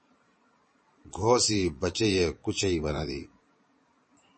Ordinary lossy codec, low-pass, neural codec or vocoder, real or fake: MP3, 32 kbps; 9.9 kHz; vocoder, 22.05 kHz, 80 mel bands, Vocos; fake